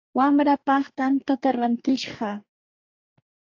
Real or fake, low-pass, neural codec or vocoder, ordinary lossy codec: fake; 7.2 kHz; codec, 44.1 kHz, 2.6 kbps, DAC; AAC, 48 kbps